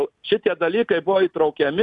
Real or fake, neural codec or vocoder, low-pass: real; none; 10.8 kHz